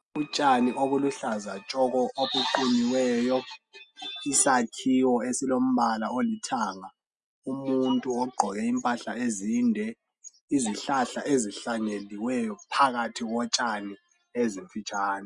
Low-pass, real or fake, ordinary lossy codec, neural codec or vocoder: 10.8 kHz; real; Opus, 64 kbps; none